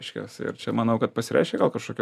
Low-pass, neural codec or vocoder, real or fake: 14.4 kHz; none; real